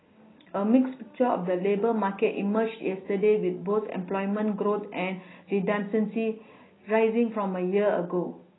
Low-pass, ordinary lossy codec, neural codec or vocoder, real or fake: 7.2 kHz; AAC, 16 kbps; none; real